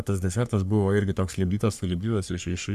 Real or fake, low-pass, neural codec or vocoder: fake; 14.4 kHz; codec, 44.1 kHz, 3.4 kbps, Pupu-Codec